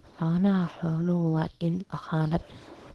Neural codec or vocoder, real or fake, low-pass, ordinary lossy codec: codec, 24 kHz, 0.9 kbps, WavTokenizer, small release; fake; 10.8 kHz; Opus, 16 kbps